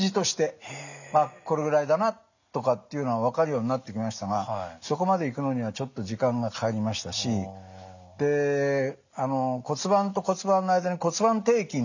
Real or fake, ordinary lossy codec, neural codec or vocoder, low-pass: real; none; none; 7.2 kHz